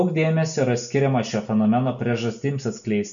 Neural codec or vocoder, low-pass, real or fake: none; 7.2 kHz; real